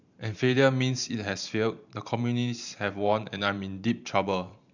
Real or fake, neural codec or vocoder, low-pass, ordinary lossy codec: fake; vocoder, 44.1 kHz, 128 mel bands every 512 samples, BigVGAN v2; 7.2 kHz; none